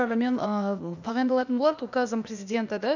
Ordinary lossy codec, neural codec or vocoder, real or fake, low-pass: none; codec, 16 kHz, 0.8 kbps, ZipCodec; fake; 7.2 kHz